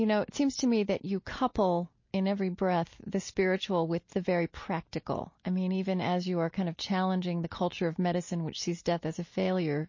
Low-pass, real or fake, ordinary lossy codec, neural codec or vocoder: 7.2 kHz; real; MP3, 32 kbps; none